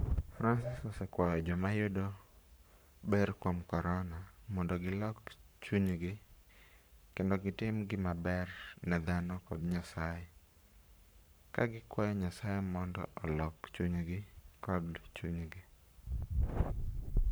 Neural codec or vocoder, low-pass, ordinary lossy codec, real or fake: codec, 44.1 kHz, 7.8 kbps, Pupu-Codec; none; none; fake